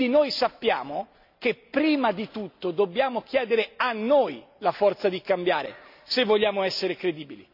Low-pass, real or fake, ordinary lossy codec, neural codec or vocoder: 5.4 kHz; real; none; none